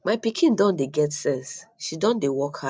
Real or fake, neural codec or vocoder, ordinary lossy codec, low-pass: real; none; none; none